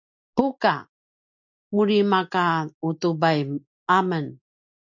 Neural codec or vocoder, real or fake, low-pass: none; real; 7.2 kHz